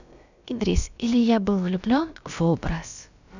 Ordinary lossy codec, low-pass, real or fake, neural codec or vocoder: none; 7.2 kHz; fake; codec, 16 kHz, about 1 kbps, DyCAST, with the encoder's durations